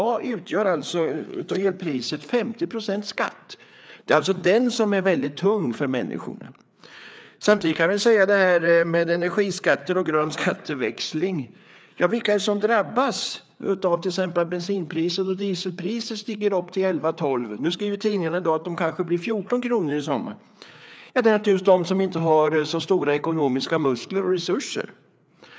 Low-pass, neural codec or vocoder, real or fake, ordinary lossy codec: none; codec, 16 kHz, 4 kbps, FreqCodec, larger model; fake; none